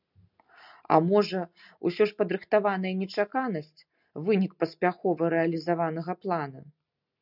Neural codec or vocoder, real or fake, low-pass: none; real; 5.4 kHz